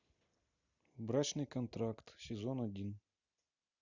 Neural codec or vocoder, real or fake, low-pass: none; real; 7.2 kHz